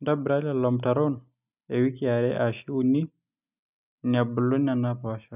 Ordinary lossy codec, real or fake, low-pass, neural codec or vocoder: none; real; 3.6 kHz; none